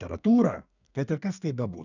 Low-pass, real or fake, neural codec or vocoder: 7.2 kHz; fake; codec, 32 kHz, 1.9 kbps, SNAC